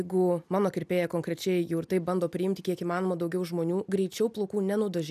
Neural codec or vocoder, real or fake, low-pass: none; real; 14.4 kHz